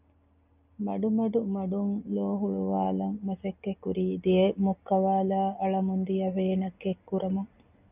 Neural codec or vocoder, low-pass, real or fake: none; 3.6 kHz; real